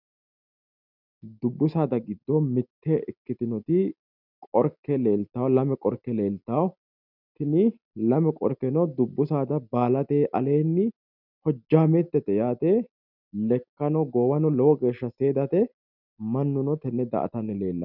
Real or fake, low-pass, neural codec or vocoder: real; 5.4 kHz; none